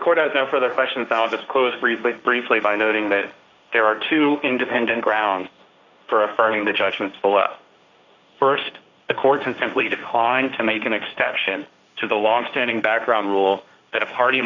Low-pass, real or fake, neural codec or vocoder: 7.2 kHz; fake; codec, 16 kHz, 1.1 kbps, Voila-Tokenizer